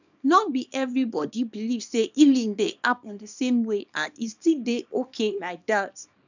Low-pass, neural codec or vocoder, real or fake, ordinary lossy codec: 7.2 kHz; codec, 24 kHz, 0.9 kbps, WavTokenizer, small release; fake; none